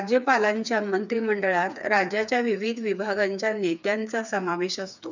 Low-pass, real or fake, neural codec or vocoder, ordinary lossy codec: 7.2 kHz; fake; codec, 16 kHz, 4 kbps, FreqCodec, smaller model; none